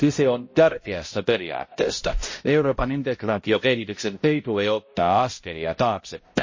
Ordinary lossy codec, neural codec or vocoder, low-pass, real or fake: MP3, 32 kbps; codec, 16 kHz, 0.5 kbps, X-Codec, HuBERT features, trained on balanced general audio; 7.2 kHz; fake